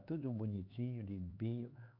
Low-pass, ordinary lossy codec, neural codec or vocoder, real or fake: 5.4 kHz; AAC, 32 kbps; codec, 16 kHz, 4 kbps, X-Codec, HuBERT features, trained on LibriSpeech; fake